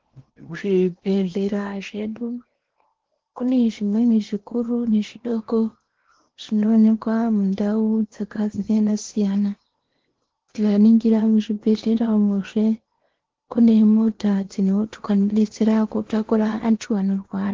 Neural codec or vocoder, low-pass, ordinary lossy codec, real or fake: codec, 16 kHz in and 24 kHz out, 0.8 kbps, FocalCodec, streaming, 65536 codes; 7.2 kHz; Opus, 16 kbps; fake